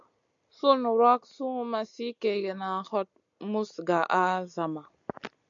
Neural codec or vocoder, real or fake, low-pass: none; real; 7.2 kHz